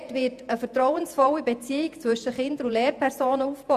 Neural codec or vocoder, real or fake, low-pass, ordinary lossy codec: vocoder, 48 kHz, 128 mel bands, Vocos; fake; 14.4 kHz; none